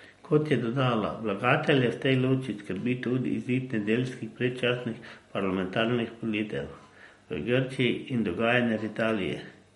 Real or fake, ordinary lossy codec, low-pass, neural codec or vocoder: real; MP3, 48 kbps; 19.8 kHz; none